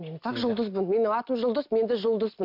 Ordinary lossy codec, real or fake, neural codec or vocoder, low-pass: MP3, 48 kbps; real; none; 5.4 kHz